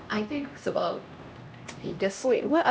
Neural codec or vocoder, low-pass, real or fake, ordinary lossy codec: codec, 16 kHz, 1 kbps, X-Codec, HuBERT features, trained on LibriSpeech; none; fake; none